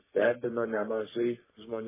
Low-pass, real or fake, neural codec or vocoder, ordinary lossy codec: 3.6 kHz; fake; codec, 44.1 kHz, 3.4 kbps, Pupu-Codec; MP3, 16 kbps